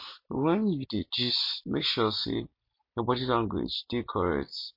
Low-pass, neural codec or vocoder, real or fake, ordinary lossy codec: 5.4 kHz; none; real; MP3, 32 kbps